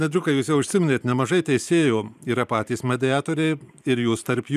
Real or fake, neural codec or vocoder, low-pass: fake; vocoder, 44.1 kHz, 128 mel bands every 512 samples, BigVGAN v2; 14.4 kHz